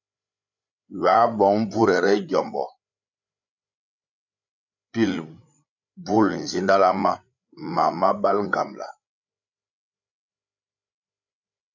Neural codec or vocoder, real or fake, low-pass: codec, 16 kHz, 8 kbps, FreqCodec, larger model; fake; 7.2 kHz